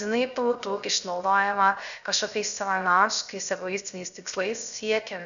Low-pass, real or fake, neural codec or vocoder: 7.2 kHz; fake; codec, 16 kHz, 0.3 kbps, FocalCodec